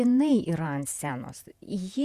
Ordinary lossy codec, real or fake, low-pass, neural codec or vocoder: Opus, 64 kbps; fake; 14.4 kHz; vocoder, 48 kHz, 128 mel bands, Vocos